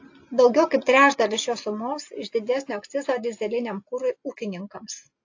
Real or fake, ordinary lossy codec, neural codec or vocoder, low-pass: real; AAC, 48 kbps; none; 7.2 kHz